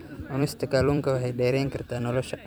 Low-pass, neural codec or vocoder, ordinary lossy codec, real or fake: none; vocoder, 44.1 kHz, 128 mel bands every 256 samples, BigVGAN v2; none; fake